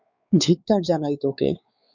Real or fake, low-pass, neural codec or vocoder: fake; 7.2 kHz; codec, 16 kHz, 4 kbps, X-Codec, WavLM features, trained on Multilingual LibriSpeech